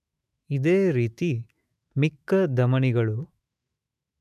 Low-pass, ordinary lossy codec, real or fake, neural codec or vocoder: 14.4 kHz; none; fake; autoencoder, 48 kHz, 128 numbers a frame, DAC-VAE, trained on Japanese speech